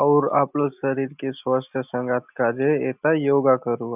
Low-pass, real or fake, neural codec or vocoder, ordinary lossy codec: 3.6 kHz; real; none; none